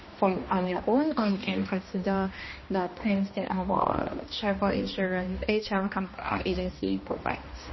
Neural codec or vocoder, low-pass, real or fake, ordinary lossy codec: codec, 16 kHz, 1 kbps, X-Codec, HuBERT features, trained on balanced general audio; 7.2 kHz; fake; MP3, 24 kbps